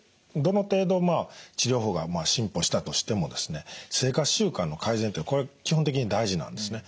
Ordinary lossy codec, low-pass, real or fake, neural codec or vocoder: none; none; real; none